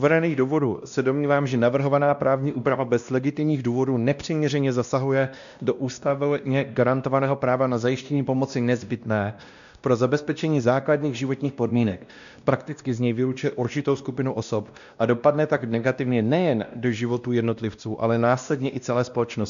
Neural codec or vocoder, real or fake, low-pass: codec, 16 kHz, 1 kbps, X-Codec, WavLM features, trained on Multilingual LibriSpeech; fake; 7.2 kHz